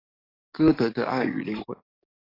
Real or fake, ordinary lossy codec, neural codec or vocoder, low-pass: fake; AAC, 24 kbps; codec, 44.1 kHz, 7.8 kbps, DAC; 5.4 kHz